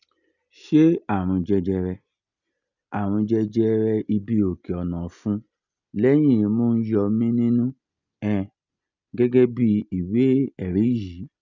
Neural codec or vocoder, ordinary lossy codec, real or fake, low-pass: none; none; real; 7.2 kHz